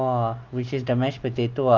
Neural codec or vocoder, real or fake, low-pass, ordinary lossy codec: none; real; 7.2 kHz; Opus, 24 kbps